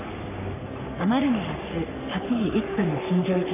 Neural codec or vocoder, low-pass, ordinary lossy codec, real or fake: codec, 44.1 kHz, 3.4 kbps, Pupu-Codec; 3.6 kHz; none; fake